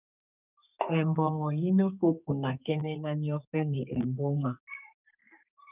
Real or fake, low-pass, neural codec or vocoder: fake; 3.6 kHz; codec, 32 kHz, 1.9 kbps, SNAC